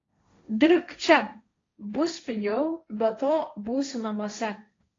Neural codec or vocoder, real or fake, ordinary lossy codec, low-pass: codec, 16 kHz, 1.1 kbps, Voila-Tokenizer; fake; AAC, 32 kbps; 7.2 kHz